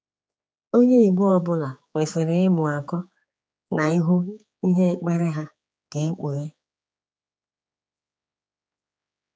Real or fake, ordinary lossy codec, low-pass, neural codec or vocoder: fake; none; none; codec, 16 kHz, 4 kbps, X-Codec, HuBERT features, trained on general audio